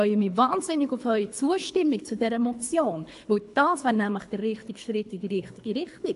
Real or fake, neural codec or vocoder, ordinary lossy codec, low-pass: fake; codec, 24 kHz, 3 kbps, HILCodec; AAC, 64 kbps; 10.8 kHz